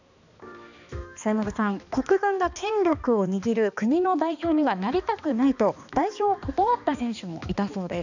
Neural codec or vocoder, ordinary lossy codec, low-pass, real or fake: codec, 16 kHz, 2 kbps, X-Codec, HuBERT features, trained on balanced general audio; none; 7.2 kHz; fake